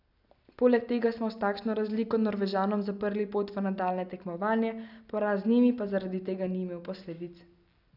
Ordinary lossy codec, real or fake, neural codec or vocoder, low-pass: none; real; none; 5.4 kHz